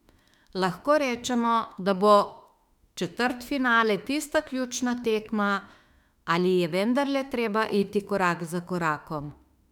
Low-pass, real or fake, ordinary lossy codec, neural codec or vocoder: 19.8 kHz; fake; none; autoencoder, 48 kHz, 32 numbers a frame, DAC-VAE, trained on Japanese speech